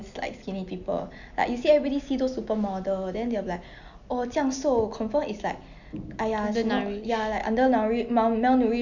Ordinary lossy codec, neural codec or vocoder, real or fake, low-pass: none; none; real; 7.2 kHz